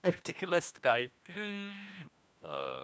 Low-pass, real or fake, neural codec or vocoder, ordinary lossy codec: none; fake; codec, 16 kHz, 1 kbps, FunCodec, trained on LibriTTS, 50 frames a second; none